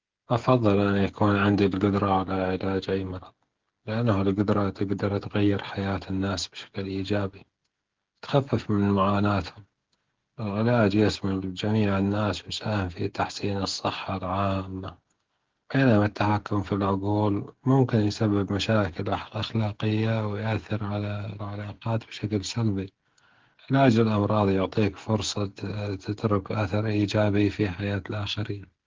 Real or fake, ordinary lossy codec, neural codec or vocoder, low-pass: fake; Opus, 16 kbps; codec, 16 kHz, 16 kbps, FreqCodec, smaller model; 7.2 kHz